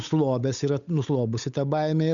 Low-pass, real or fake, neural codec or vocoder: 7.2 kHz; fake; codec, 16 kHz, 8 kbps, FunCodec, trained on Chinese and English, 25 frames a second